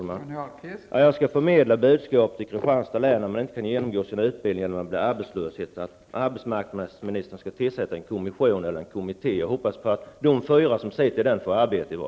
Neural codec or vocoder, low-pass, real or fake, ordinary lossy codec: none; none; real; none